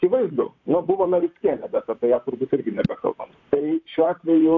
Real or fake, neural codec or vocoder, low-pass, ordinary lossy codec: fake; vocoder, 44.1 kHz, 128 mel bands, Pupu-Vocoder; 7.2 kHz; AAC, 48 kbps